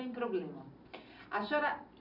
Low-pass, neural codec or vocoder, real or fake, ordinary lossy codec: 5.4 kHz; none; real; none